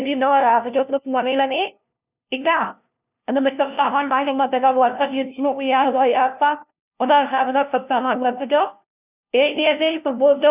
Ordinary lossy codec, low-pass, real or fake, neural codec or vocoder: none; 3.6 kHz; fake; codec, 16 kHz, 0.5 kbps, FunCodec, trained on LibriTTS, 25 frames a second